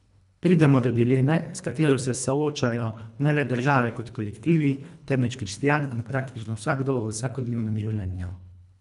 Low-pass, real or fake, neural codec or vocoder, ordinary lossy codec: 10.8 kHz; fake; codec, 24 kHz, 1.5 kbps, HILCodec; none